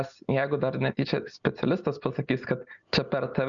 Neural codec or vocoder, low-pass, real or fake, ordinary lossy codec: none; 7.2 kHz; real; MP3, 96 kbps